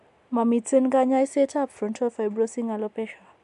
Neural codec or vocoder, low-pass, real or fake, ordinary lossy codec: none; 10.8 kHz; real; MP3, 64 kbps